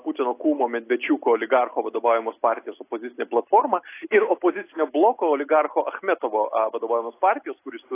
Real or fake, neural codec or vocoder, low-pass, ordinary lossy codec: real; none; 3.6 kHz; AAC, 24 kbps